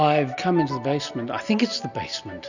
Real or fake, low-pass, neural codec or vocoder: real; 7.2 kHz; none